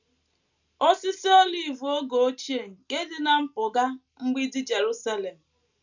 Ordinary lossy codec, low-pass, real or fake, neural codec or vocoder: none; 7.2 kHz; real; none